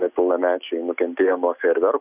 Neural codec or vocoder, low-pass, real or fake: none; 3.6 kHz; real